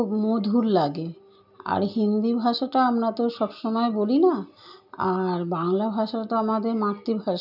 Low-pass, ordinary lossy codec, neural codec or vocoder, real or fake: 5.4 kHz; none; none; real